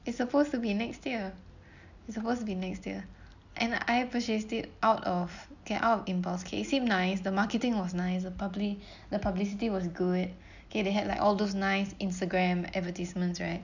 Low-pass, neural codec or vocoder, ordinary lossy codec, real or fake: 7.2 kHz; none; none; real